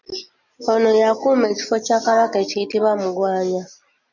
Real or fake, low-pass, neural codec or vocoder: real; 7.2 kHz; none